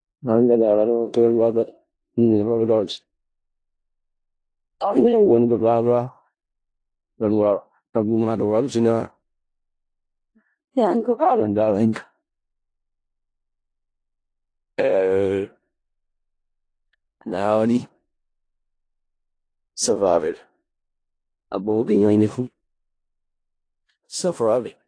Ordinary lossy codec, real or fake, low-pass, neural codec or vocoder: AAC, 48 kbps; fake; 9.9 kHz; codec, 16 kHz in and 24 kHz out, 0.4 kbps, LongCat-Audio-Codec, four codebook decoder